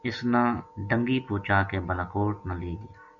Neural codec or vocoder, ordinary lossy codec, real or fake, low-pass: none; MP3, 96 kbps; real; 7.2 kHz